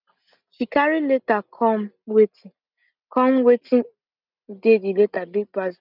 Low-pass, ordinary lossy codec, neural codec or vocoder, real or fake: 5.4 kHz; none; none; real